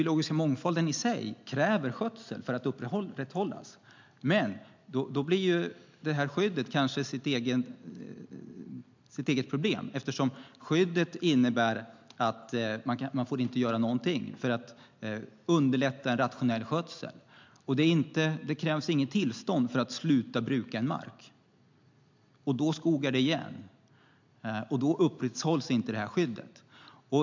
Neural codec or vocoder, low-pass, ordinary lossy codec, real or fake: none; 7.2 kHz; MP3, 64 kbps; real